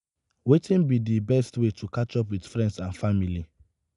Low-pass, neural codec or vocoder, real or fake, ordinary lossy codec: 10.8 kHz; none; real; none